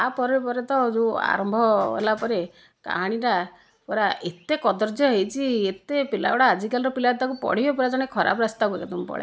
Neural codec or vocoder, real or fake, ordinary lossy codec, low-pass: none; real; none; none